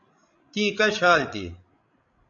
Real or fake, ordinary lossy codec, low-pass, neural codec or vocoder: fake; AAC, 64 kbps; 7.2 kHz; codec, 16 kHz, 16 kbps, FreqCodec, larger model